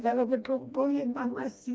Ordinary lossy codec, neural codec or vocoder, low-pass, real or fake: none; codec, 16 kHz, 1 kbps, FreqCodec, smaller model; none; fake